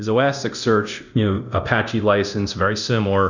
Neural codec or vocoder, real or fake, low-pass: codec, 24 kHz, 0.9 kbps, DualCodec; fake; 7.2 kHz